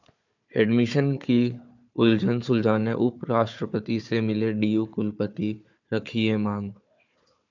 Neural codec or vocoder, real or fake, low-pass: codec, 16 kHz, 4 kbps, FunCodec, trained on Chinese and English, 50 frames a second; fake; 7.2 kHz